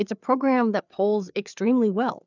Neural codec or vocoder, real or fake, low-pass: codec, 16 kHz, 4 kbps, FreqCodec, larger model; fake; 7.2 kHz